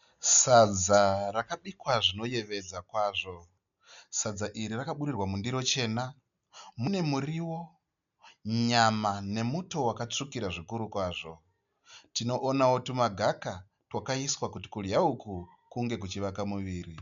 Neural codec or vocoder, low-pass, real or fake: none; 7.2 kHz; real